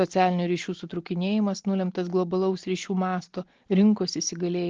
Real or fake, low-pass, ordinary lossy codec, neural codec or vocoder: real; 7.2 kHz; Opus, 16 kbps; none